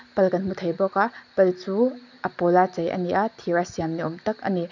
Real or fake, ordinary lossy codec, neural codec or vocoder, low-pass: real; none; none; 7.2 kHz